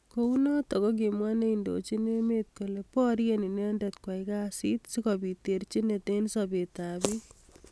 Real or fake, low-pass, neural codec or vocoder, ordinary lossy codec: real; none; none; none